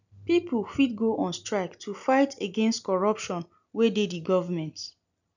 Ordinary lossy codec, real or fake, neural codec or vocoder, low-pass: none; real; none; 7.2 kHz